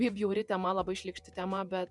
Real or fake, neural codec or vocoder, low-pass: fake; vocoder, 48 kHz, 128 mel bands, Vocos; 10.8 kHz